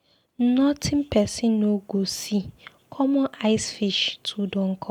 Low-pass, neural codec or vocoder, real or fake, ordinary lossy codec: 19.8 kHz; none; real; none